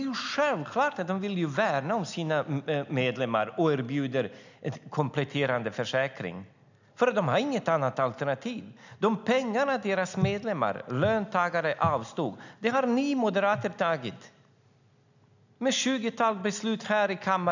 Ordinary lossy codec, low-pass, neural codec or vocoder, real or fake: none; 7.2 kHz; none; real